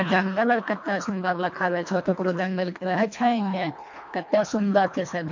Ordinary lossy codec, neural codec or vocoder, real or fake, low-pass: MP3, 48 kbps; codec, 24 kHz, 1.5 kbps, HILCodec; fake; 7.2 kHz